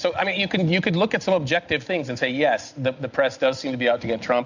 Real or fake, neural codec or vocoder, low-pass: real; none; 7.2 kHz